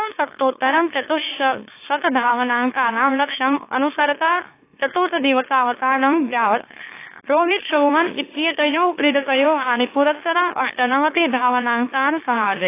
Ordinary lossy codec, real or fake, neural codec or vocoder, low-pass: AAC, 24 kbps; fake; autoencoder, 44.1 kHz, a latent of 192 numbers a frame, MeloTTS; 3.6 kHz